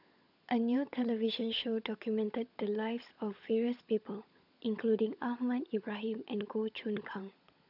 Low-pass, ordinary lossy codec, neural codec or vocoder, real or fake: 5.4 kHz; none; codec, 16 kHz, 16 kbps, FunCodec, trained on LibriTTS, 50 frames a second; fake